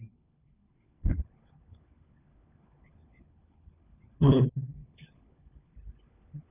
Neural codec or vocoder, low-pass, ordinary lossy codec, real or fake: codec, 16 kHz, 4 kbps, FunCodec, trained on LibriTTS, 50 frames a second; 3.6 kHz; none; fake